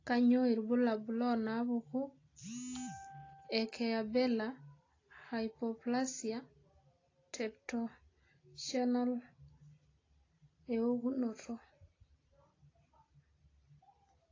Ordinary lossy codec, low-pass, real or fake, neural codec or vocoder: AAC, 32 kbps; 7.2 kHz; real; none